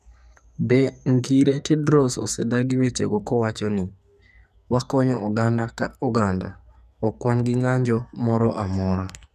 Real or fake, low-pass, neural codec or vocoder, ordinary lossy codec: fake; 14.4 kHz; codec, 44.1 kHz, 2.6 kbps, SNAC; none